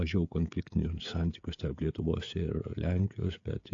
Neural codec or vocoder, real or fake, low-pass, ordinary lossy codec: codec, 16 kHz, 16 kbps, FreqCodec, smaller model; fake; 7.2 kHz; AAC, 48 kbps